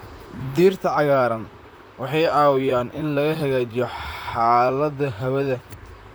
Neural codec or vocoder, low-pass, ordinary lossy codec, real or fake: vocoder, 44.1 kHz, 128 mel bands, Pupu-Vocoder; none; none; fake